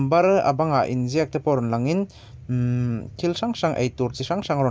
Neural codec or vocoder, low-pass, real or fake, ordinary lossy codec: none; none; real; none